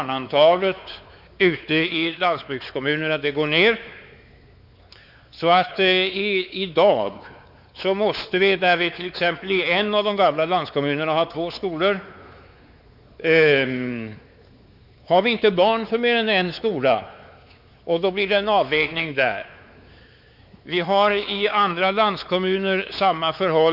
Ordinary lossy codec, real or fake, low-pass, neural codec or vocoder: Opus, 64 kbps; fake; 5.4 kHz; codec, 16 kHz, 4 kbps, X-Codec, WavLM features, trained on Multilingual LibriSpeech